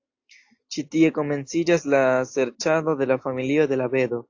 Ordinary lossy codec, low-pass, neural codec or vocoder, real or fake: AAC, 48 kbps; 7.2 kHz; none; real